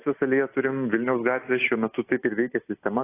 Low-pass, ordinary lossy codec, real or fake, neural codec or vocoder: 3.6 kHz; AAC, 24 kbps; real; none